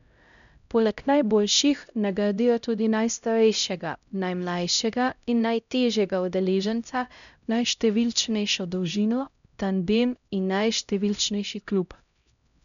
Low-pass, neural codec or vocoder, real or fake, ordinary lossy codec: 7.2 kHz; codec, 16 kHz, 0.5 kbps, X-Codec, HuBERT features, trained on LibriSpeech; fake; none